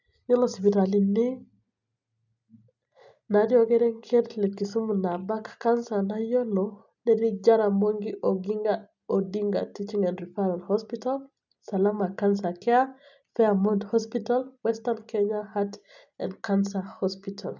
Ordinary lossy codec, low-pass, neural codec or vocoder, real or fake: none; 7.2 kHz; none; real